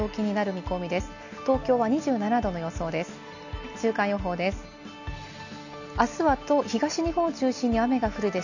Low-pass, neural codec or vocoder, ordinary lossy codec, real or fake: 7.2 kHz; none; none; real